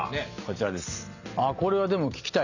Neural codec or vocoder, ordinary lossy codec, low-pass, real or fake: none; none; 7.2 kHz; real